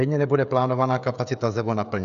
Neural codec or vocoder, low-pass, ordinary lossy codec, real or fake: codec, 16 kHz, 16 kbps, FreqCodec, smaller model; 7.2 kHz; AAC, 96 kbps; fake